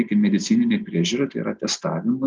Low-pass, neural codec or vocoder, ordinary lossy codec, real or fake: 7.2 kHz; none; Opus, 32 kbps; real